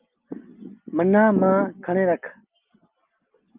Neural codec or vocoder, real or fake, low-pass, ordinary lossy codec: none; real; 3.6 kHz; Opus, 32 kbps